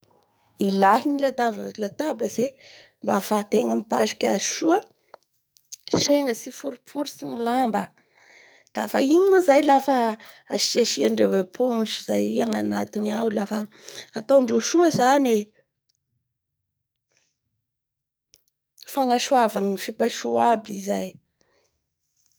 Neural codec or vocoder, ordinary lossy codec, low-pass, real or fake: codec, 44.1 kHz, 2.6 kbps, SNAC; none; none; fake